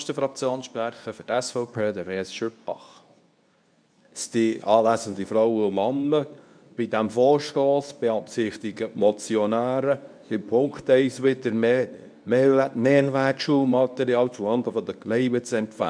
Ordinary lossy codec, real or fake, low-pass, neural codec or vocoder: none; fake; 9.9 kHz; codec, 24 kHz, 0.9 kbps, WavTokenizer, medium speech release version 2